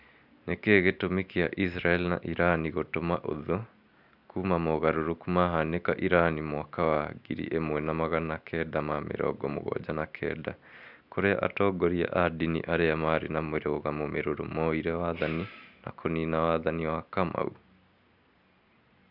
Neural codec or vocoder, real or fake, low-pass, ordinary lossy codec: none; real; 5.4 kHz; none